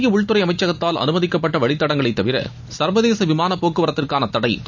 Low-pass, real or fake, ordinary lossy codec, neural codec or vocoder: 7.2 kHz; real; none; none